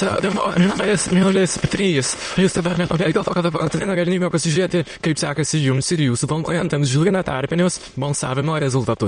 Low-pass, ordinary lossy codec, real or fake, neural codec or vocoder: 9.9 kHz; MP3, 48 kbps; fake; autoencoder, 22.05 kHz, a latent of 192 numbers a frame, VITS, trained on many speakers